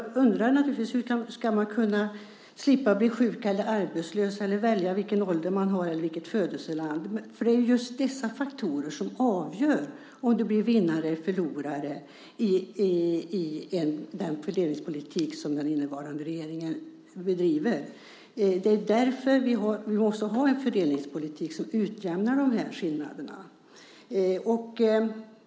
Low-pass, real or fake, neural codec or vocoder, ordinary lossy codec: none; real; none; none